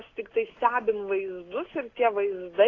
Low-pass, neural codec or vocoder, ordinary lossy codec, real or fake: 7.2 kHz; none; AAC, 32 kbps; real